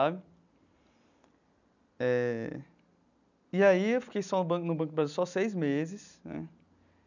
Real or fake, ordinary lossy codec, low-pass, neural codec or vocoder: real; none; 7.2 kHz; none